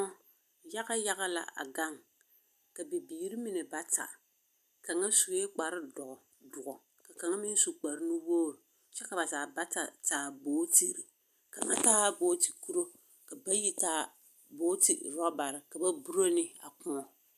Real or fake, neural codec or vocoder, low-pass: real; none; 14.4 kHz